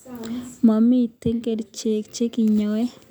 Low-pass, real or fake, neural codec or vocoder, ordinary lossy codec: none; real; none; none